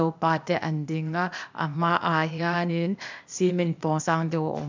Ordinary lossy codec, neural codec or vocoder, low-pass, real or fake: MP3, 64 kbps; codec, 16 kHz, 0.8 kbps, ZipCodec; 7.2 kHz; fake